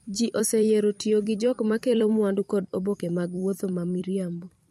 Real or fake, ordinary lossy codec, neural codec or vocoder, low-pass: fake; MP3, 64 kbps; vocoder, 44.1 kHz, 128 mel bands every 256 samples, BigVGAN v2; 19.8 kHz